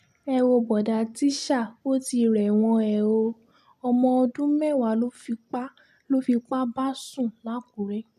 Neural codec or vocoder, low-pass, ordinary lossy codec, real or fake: none; 14.4 kHz; none; real